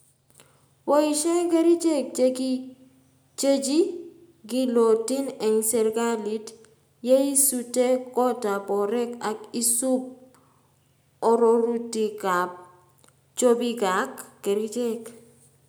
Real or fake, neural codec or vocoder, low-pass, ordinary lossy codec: real; none; none; none